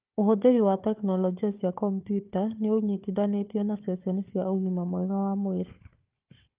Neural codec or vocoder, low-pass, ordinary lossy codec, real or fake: codec, 16 kHz, 4 kbps, FunCodec, trained on Chinese and English, 50 frames a second; 3.6 kHz; Opus, 24 kbps; fake